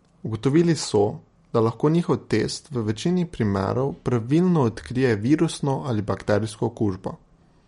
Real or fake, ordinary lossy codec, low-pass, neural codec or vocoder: real; MP3, 48 kbps; 10.8 kHz; none